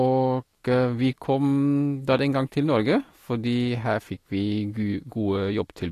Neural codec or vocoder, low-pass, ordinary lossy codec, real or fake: autoencoder, 48 kHz, 128 numbers a frame, DAC-VAE, trained on Japanese speech; 14.4 kHz; AAC, 48 kbps; fake